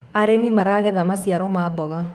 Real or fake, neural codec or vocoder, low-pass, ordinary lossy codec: fake; autoencoder, 48 kHz, 32 numbers a frame, DAC-VAE, trained on Japanese speech; 19.8 kHz; Opus, 32 kbps